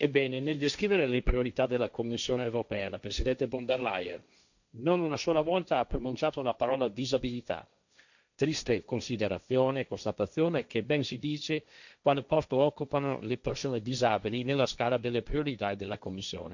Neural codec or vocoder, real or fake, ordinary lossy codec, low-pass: codec, 16 kHz, 1.1 kbps, Voila-Tokenizer; fake; none; 7.2 kHz